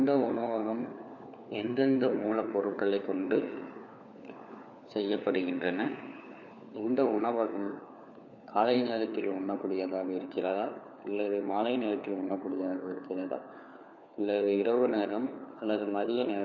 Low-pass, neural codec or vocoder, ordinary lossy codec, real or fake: 7.2 kHz; codec, 16 kHz, 4 kbps, FunCodec, trained on LibriTTS, 50 frames a second; none; fake